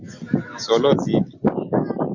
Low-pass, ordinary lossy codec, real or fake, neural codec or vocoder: 7.2 kHz; AAC, 48 kbps; real; none